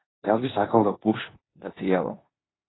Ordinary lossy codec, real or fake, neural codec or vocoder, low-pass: AAC, 16 kbps; fake; codec, 16 kHz in and 24 kHz out, 0.9 kbps, LongCat-Audio-Codec, four codebook decoder; 7.2 kHz